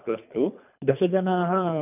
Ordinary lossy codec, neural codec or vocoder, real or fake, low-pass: none; codec, 24 kHz, 3 kbps, HILCodec; fake; 3.6 kHz